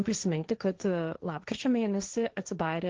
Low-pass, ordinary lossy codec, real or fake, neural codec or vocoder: 7.2 kHz; Opus, 16 kbps; fake; codec, 16 kHz, 1.1 kbps, Voila-Tokenizer